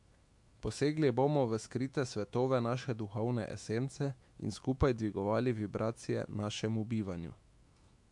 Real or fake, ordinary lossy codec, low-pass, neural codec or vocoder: fake; MP3, 64 kbps; 10.8 kHz; autoencoder, 48 kHz, 128 numbers a frame, DAC-VAE, trained on Japanese speech